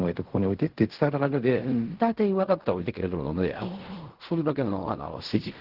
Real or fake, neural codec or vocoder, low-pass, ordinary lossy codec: fake; codec, 16 kHz in and 24 kHz out, 0.4 kbps, LongCat-Audio-Codec, fine tuned four codebook decoder; 5.4 kHz; Opus, 16 kbps